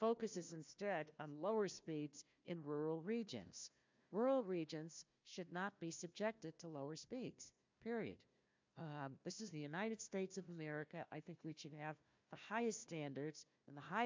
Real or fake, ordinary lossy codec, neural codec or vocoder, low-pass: fake; MP3, 64 kbps; codec, 16 kHz, 1 kbps, FunCodec, trained on Chinese and English, 50 frames a second; 7.2 kHz